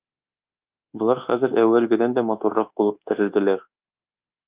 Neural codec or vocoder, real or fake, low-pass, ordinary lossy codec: codec, 24 kHz, 1.2 kbps, DualCodec; fake; 3.6 kHz; Opus, 32 kbps